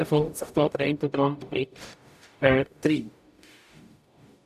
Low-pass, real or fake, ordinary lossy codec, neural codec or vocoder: 14.4 kHz; fake; none; codec, 44.1 kHz, 0.9 kbps, DAC